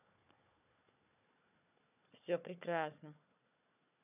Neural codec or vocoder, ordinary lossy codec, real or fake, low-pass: codec, 16 kHz, 4 kbps, FunCodec, trained on Chinese and English, 50 frames a second; none; fake; 3.6 kHz